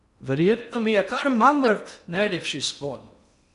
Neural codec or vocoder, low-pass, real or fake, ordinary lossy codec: codec, 16 kHz in and 24 kHz out, 0.8 kbps, FocalCodec, streaming, 65536 codes; 10.8 kHz; fake; none